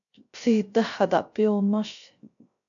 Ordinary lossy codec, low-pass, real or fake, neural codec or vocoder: AAC, 64 kbps; 7.2 kHz; fake; codec, 16 kHz, 0.3 kbps, FocalCodec